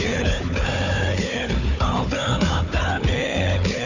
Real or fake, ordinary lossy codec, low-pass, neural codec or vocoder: fake; none; 7.2 kHz; codec, 16 kHz, 4 kbps, FunCodec, trained on Chinese and English, 50 frames a second